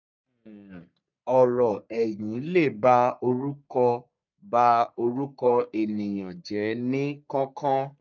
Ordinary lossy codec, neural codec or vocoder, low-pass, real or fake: none; codec, 44.1 kHz, 3.4 kbps, Pupu-Codec; 7.2 kHz; fake